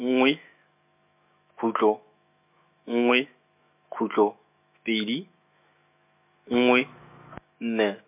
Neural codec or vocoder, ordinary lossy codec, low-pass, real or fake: autoencoder, 48 kHz, 128 numbers a frame, DAC-VAE, trained on Japanese speech; MP3, 32 kbps; 3.6 kHz; fake